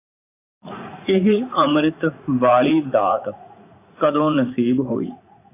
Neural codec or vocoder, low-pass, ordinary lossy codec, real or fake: vocoder, 44.1 kHz, 80 mel bands, Vocos; 3.6 kHz; AAC, 24 kbps; fake